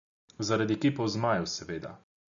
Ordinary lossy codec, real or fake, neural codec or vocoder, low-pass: MP3, 96 kbps; real; none; 7.2 kHz